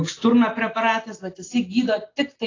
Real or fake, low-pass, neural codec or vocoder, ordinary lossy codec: real; 7.2 kHz; none; AAC, 32 kbps